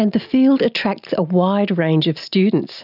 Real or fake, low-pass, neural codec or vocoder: real; 5.4 kHz; none